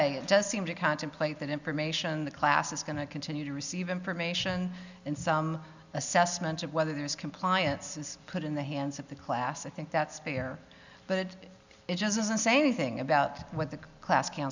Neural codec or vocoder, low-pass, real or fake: none; 7.2 kHz; real